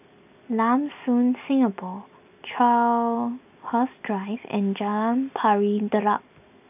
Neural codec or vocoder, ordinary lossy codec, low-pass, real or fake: none; none; 3.6 kHz; real